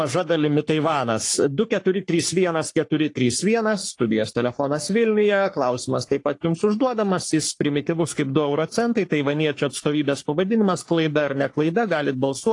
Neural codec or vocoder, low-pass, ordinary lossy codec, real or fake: codec, 44.1 kHz, 3.4 kbps, Pupu-Codec; 10.8 kHz; AAC, 48 kbps; fake